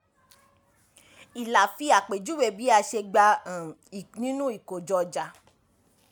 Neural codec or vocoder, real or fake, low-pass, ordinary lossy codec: none; real; none; none